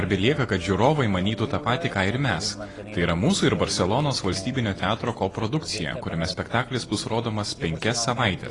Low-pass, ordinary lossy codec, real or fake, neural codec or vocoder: 10.8 kHz; AAC, 32 kbps; real; none